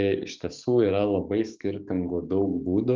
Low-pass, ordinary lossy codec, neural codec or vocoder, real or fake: 7.2 kHz; Opus, 32 kbps; codec, 44.1 kHz, 7.8 kbps, DAC; fake